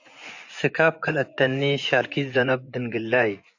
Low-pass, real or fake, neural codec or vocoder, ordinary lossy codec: 7.2 kHz; fake; vocoder, 24 kHz, 100 mel bands, Vocos; AAC, 48 kbps